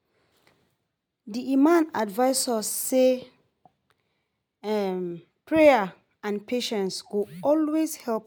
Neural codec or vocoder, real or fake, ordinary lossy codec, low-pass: none; real; none; none